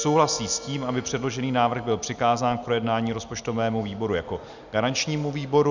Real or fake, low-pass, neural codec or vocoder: real; 7.2 kHz; none